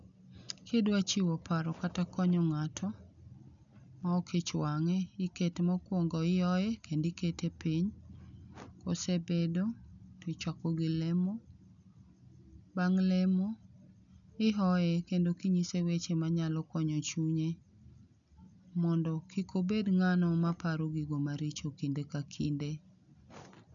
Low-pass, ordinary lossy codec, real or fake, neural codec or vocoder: 7.2 kHz; none; real; none